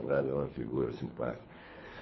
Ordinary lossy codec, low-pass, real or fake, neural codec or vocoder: MP3, 24 kbps; 7.2 kHz; fake; codec, 24 kHz, 3 kbps, HILCodec